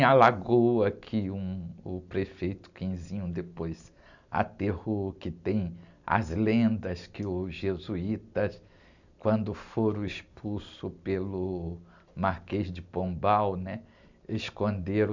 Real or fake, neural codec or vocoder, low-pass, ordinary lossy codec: real; none; 7.2 kHz; none